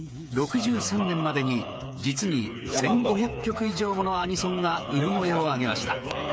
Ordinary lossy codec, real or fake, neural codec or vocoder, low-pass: none; fake; codec, 16 kHz, 8 kbps, FreqCodec, smaller model; none